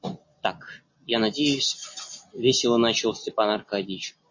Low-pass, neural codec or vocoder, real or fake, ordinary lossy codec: 7.2 kHz; none; real; MP3, 32 kbps